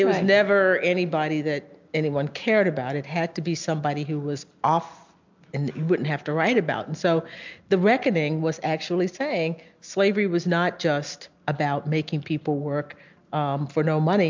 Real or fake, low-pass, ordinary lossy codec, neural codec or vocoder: real; 7.2 kHz; MP3, 64 kbps; none